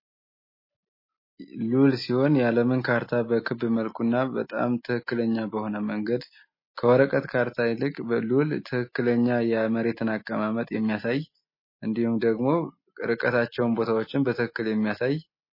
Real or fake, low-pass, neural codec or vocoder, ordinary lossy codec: real; 5.4 kHz; none; MP3, 24 kbps